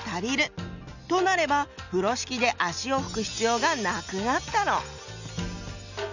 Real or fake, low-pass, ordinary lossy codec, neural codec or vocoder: real; 7.2 kHz; none; none